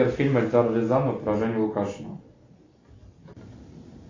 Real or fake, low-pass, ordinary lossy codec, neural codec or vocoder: real; 7.2 kHz; AAC, 32 kbps; none